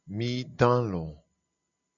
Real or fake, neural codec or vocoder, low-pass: real; none; 7.2 kHz